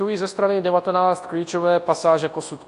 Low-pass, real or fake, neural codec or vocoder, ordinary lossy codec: 10.8 kHz; fake; codec, 24 kHz, 0.9 kbps, WavTokenizer, large speech release; AAC, 48 kbps